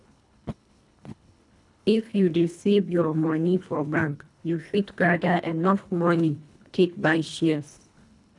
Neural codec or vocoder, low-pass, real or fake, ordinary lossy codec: codec, 24 kHz, 1.5 kbps, HILCodec; 10.8 kHz; fake; none